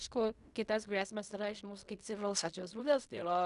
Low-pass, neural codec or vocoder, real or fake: 10.8 kHz; codec, 16 kHz in and 24 kHz out, 0.4 kbps, LongCat-Audio-Codec, fine tuned four codebook decoder; fake